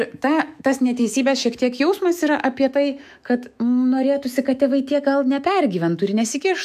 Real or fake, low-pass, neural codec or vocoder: fake; 14.4 kHz; autoencoder, 48 kHz, 128 numbers a frame, DAC-VAE, trained on Japanese speech